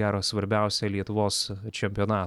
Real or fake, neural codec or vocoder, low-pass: real; none; 19.8 kHz